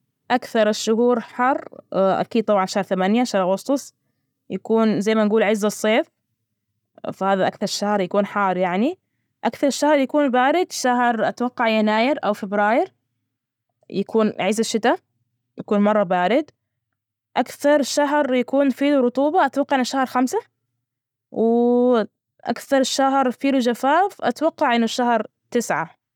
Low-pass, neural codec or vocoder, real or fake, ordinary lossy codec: 19.8 kHz; none; real; none